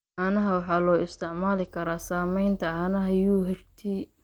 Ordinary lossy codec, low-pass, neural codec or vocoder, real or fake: Opus, 24 kbps; 19.8 kHz; none; real